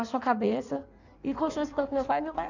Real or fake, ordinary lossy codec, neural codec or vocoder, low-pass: fake; none; codec, 16 kHz in and 24 kHz out, 1.1 kbps, FireRedTTS-2 codec; 7.2 kHz